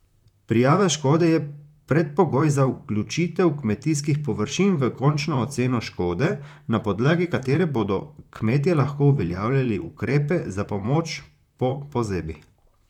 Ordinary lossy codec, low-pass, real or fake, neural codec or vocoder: none; 19.8 kHz; fake; vocoder, 44.1 kHz, 128 mel bands, Pupu-Vocoder